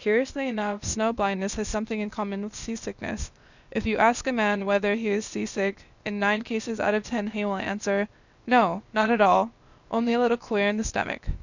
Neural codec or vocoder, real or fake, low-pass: codec, 16 kHz, 0.8 kbps, ZipCodec; fake; 7.2 kHz